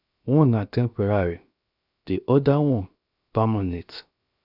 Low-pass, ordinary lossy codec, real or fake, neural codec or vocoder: 5.4 kHz; none; fake; codec, 16 kHz, 0.7 kbps, FocalCodec